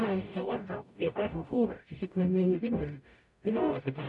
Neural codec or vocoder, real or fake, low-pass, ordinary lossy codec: codec, 44.1 kHz, 0.9 kbps, DAC; fake; 10.8 kHz; none